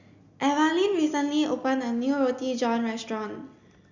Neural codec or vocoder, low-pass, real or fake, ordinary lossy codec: none; 7.2 kHz; real; Opus, 64 kbps